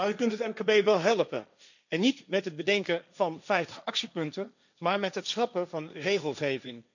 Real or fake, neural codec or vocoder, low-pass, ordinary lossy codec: fake; codec, 16 kHz, 1.1 kbps, Voila-Tokenizer; 7.2 kHz; none